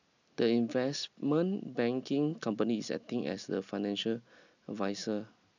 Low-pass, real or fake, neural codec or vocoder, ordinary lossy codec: 7.2 kHz; real; none; none